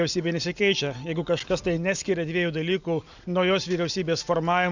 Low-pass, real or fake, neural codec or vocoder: 7.2 kHz; real; none